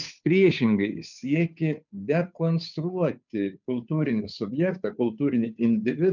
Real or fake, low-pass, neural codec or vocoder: fake; 7.2 kHz; codec, 16 kHz, 2 kbps, FunCodec, trained on Chinese and English, 25 frames a second